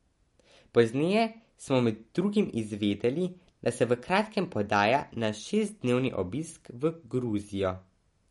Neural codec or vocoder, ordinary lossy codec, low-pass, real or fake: none; MP3, 48 kbps; 19.8 kHz; real